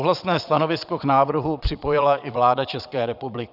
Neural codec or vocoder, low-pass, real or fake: vocoder, 22.05 kHz, 80 mel bands, Vocos; 5.4 kHz; fake